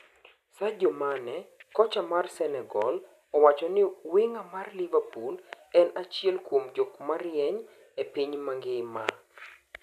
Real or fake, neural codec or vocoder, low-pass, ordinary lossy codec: real; none; 14.4 kHz; none